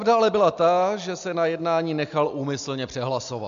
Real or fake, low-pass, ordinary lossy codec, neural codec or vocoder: real; 7.2 kHz; MP3, 64 kbps; none